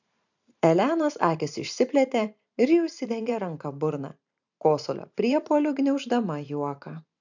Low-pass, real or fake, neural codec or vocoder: 7.2 kHz; fake; vocoder, 44.1 kHz, 128 mel bands every 512 samples, BigVGAN v2